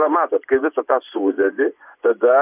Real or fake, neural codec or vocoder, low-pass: fake; vocoder, 24 kHz, 100 mel bands, Vocos; 3.6 kHz